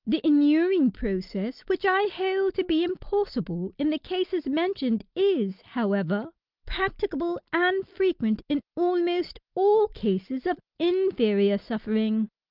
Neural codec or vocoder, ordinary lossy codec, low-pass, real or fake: none; Opus, 24 kbps; 5.4 kHz; real